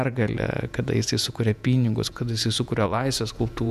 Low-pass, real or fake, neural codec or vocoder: 14.4 kHz; fake; vocoder, 48 kHz, 128 mel bands, Vocos